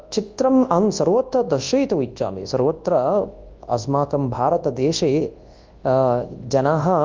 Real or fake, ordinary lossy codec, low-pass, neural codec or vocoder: fake; Opus, 32 kbps; 7.2 kHz; codec, 24 kHz, 0.9 kbps, WavTokenizer, large speech release